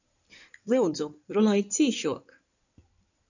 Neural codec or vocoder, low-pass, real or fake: codec, 16 kHz in and 24 kHz out, 2.2 kbps, FireRedTTS-2 codec; 7.2 kHz; fake